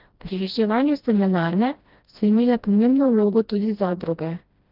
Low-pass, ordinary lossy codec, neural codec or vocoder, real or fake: 5.4 kHz; Opus, 32 kbps; codec, 16 kHz, 1 kbps, FreqCodec, smaller model; fake